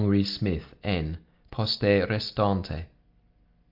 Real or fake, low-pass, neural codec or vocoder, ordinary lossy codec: real; 5.4 kHz; none; Opus, 32 kbps